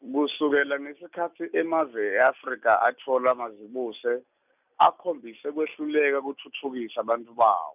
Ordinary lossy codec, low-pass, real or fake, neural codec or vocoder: none; 3.6 kHz; real; none